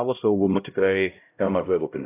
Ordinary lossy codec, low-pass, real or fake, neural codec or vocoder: AAC, 24 kbps; 3.6 kHz; fake; codec, 16 kHz, 0.5 kbps, X-Codec, HuBERT features, trained on LibriSpeech